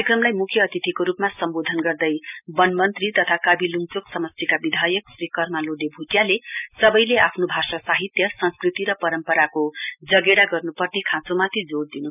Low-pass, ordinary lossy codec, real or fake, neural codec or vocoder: 3.6 kHz; none; real; none